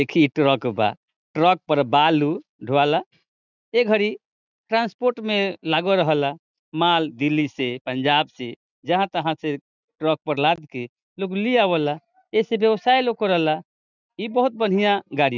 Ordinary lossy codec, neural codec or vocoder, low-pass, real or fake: none; none; 7.2 kHz; real